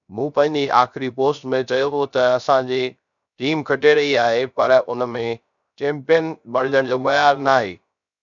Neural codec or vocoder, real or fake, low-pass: codec, 16 kHz, 0.3 kbps, FocalCodec; fake; 7.2 kHz